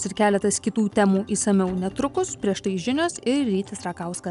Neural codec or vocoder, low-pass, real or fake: none; 10.8 kHz; real